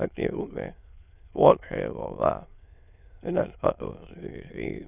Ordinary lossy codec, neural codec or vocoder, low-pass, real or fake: none; autoencoder, 22.05 kHz, a latent of 192 numbers a frame, VITS, trained on many speakers; 3.6 kHz; fake